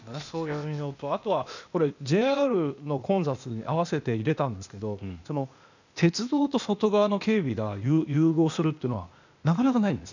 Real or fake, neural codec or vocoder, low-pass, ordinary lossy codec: fake; codec, 16 kHz, 0.8 kbps, ZipCodec; 7.2 kHz; none